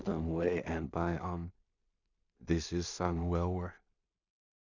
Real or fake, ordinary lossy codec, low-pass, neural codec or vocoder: fake; none; 7.2 kHz; codec, 16 kHz in and 24 kHz out, 0.4 kbps, LongCat-Audio-Codec, two codebook decoder